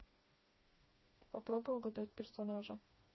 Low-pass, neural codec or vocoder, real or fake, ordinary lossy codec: 7.2 kHz; codec, 16 kHz, 2 kbps, FreqCodec, smaller model; fake; MP3, 24 kbps